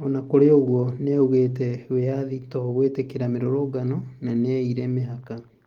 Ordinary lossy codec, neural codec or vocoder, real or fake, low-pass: Opus, 24 kbps; none; real; 19.8 kHz